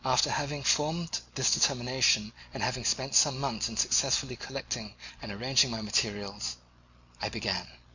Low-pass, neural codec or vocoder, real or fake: 7.2 kHz; none; real